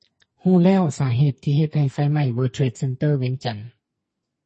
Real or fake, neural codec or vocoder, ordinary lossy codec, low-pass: fake; codec, 44.1 kHz, 2.6 kbps, SNAC; MP3, 32 kbps; 10.8 kHz